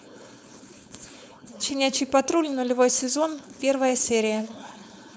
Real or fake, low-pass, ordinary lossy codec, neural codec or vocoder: fake; none; none; codec, 16 kHz, 4.8 kbps, FACodec